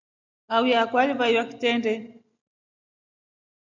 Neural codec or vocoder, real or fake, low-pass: none; real; 7.2 kHz